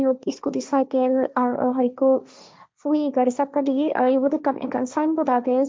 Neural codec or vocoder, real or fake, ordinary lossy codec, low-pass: codec, 16 kHz, 1.1 kbps, Voila-Tokenizer; fake; none; none